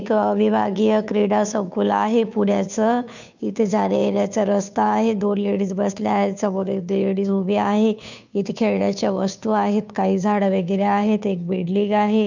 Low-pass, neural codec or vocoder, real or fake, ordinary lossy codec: 7.2 kHz; codec, 16 kHz, 2 kbps, FunCodec, trained on Chinese and English, 25 frames a second; fake; none